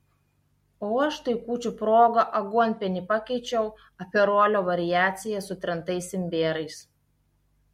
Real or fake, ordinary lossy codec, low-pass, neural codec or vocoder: real; MP3, 64 kbps; 19.8 kHz; none